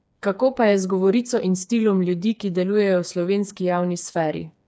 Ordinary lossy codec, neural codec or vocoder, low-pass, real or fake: none; codec, 16 kHz, 4 kbps, FreqCodec, smaller model; none; fake